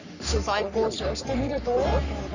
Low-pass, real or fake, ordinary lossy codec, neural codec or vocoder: 7.2 kHz; fake; none; codec, 44.1 kHz, 3.4 kbps, Pupu-Codec